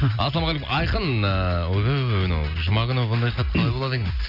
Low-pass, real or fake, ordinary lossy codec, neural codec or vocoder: 5.4 kHz; real; none; none